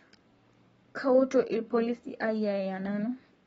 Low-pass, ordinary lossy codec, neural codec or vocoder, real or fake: 19.8 kHz; AAC, 24 kbps; codec, 44.1 kHz, 7.8 kbps, Pupu-Codec; fake